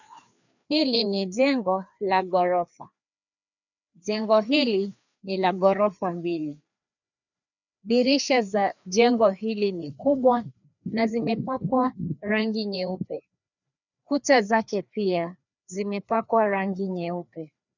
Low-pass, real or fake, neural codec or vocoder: 7.2 kHz; fake; codec, 16 kHz, 2 kbps, FreqCodec, larger model